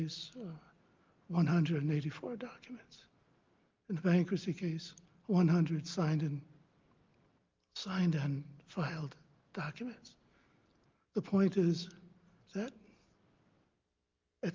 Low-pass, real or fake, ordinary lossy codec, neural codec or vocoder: 7.2 kHz; real; Opus, 24 kbps; none